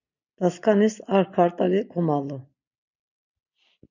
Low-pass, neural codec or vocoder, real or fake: 7.2 kHz; none; real